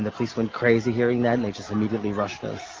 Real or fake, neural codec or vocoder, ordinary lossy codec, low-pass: real; none; Opus, 32 kbps; 7.2 kHz